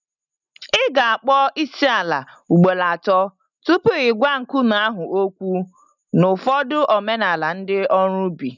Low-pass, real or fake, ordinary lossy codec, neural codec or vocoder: 7.2 kHz; real; none; none